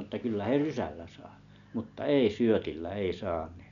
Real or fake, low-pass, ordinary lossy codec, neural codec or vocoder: real; 7.2 kHz; none; none